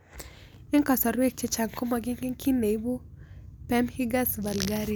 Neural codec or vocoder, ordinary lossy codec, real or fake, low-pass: none; none; real; none